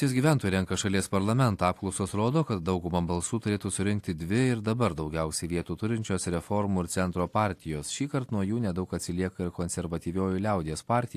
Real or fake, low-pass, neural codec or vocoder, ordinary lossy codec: real; 14.4 kHz; none; AAC, 64 kbps